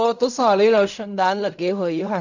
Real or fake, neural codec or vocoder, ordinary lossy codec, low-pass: fake; codec, 16 kHz in and 24 kHz out, 0.4 kbps, LongCat-Audio-Codec, fine tuned four codebook decoder; none; 7.2 kHz